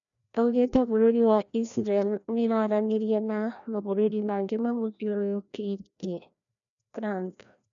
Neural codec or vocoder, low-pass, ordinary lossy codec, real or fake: codec, 16 kHz, 1 kbps, FreqCodec, larger model; 7.2 kHz; none; fake